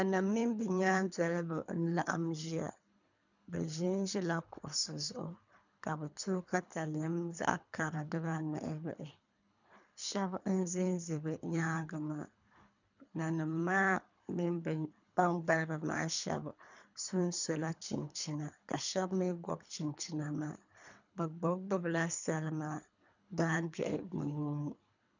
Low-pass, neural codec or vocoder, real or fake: 7.2 kHz; codec, 24 kHz, 3 kbps, HILCodec; fake